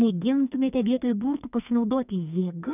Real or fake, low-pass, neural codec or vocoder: fake; 3.6 kHz; codec, 44.1 kHz, 2.6 kbps, SNAC